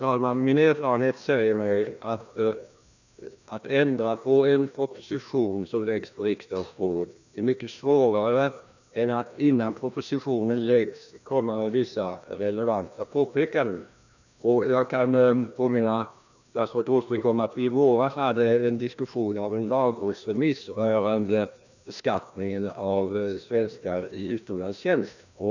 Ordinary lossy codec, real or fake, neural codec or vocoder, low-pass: none; fake; codec, 16 kHz, 1 kbps, FreqCodec, larger model; 7.2 kHz